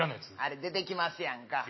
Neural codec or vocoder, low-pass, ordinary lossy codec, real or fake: none; 7.2 kHz; MP3, 24 kbps; real